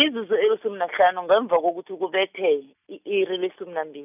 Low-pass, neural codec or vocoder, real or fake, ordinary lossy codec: 3.6 kHz; none; real; none